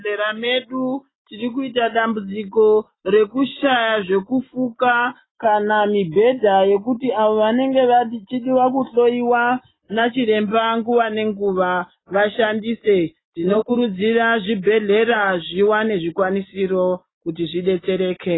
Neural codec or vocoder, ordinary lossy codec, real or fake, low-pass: none; AAC, 16 kbps; real; 7.2 kHz